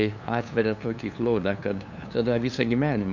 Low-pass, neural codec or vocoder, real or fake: 7.2 kHz; codec, 16 kHz, 2 kbps, FunCodec, trained on LibriTTS, 25 frames a second; fake